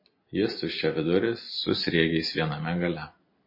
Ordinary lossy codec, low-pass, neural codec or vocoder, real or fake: MP3, 24 kbps; 5.4 kHz; none; real